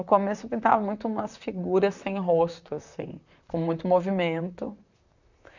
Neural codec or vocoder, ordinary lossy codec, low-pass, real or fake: vocoder, 44.1 kHz, 128 mel bands, Pupu-Vocoder; none; 7.2 kHz; fake